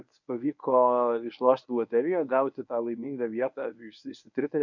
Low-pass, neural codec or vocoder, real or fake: 7.2 kHz; codec, 24 kHz, 0.9 kbps, WavTokenizer, medium speech release version 1; fake